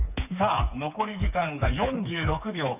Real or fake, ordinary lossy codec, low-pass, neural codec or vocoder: fake; none; 3.6 kHz; codec, 16 kHz, 4 kbps, FreqCodec, smaller model